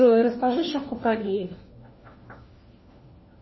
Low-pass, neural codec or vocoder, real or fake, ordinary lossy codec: 7.2 kHz; codec, 16 kHz, 1 kbps, FunCodec, trained on LibriTTS, 50 frames a second; fake; MP3, 24 kbps